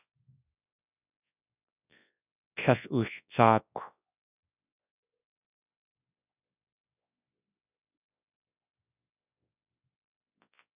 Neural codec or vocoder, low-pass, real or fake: codec, 24 kHz, 0.9 kbps, WavTokenizer, large speech release; 3.6 kHz; fake